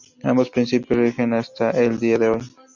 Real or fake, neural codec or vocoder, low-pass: real; none; 7.2 kHz